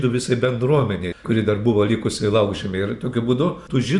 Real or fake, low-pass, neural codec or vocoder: fake; 10.8 kHz; vocoder, 44.1 kHz, 128 mel bands every 512 samples, BigVGAN v2